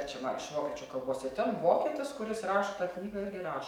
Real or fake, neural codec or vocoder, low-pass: fake; codec, 44.1 kHz, 7.8 kbps, DAC; 19.8 kHz